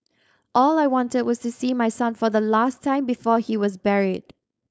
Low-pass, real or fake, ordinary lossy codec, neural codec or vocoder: none; fake; none; codec, 16 kHz, 4.8 kbps, FACodec